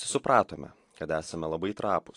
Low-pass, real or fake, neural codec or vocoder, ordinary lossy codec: 10.8 kHz; real; none; AAC, 48 kbps